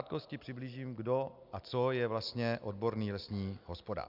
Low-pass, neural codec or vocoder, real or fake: 5.4 kHz; none; real